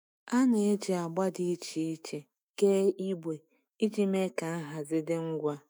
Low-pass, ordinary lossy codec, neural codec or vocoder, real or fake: none; none; autoencoder, 48 kHz, 128 numbers a frame, DAC-VAE, trained on Japanese speech; fake